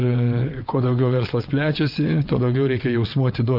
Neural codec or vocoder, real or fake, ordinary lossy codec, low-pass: vocoder, 22.05 kHz, 80 mel bands, WaveNeXt; fake; Opus, 24 kbps; 5.4 kHz